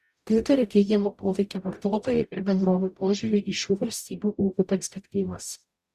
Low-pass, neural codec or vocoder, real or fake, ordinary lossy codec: 14.4 kHz; codec, 44.1 kHz, 0.9 kbps, DAC; fake; Opus, 64 kbps